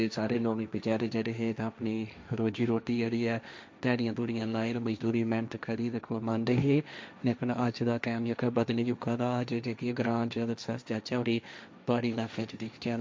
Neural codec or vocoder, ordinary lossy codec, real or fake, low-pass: codec, 16 kHz, 1.1 kbps, Voila-Tokenizer; none; fake; none